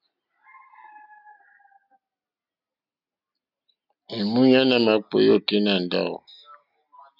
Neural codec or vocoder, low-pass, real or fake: none; 5.4 kHz; real